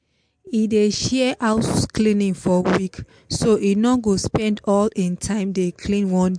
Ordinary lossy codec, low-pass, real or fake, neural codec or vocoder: MP3, 64 kbps; 9.9 kHz; real; none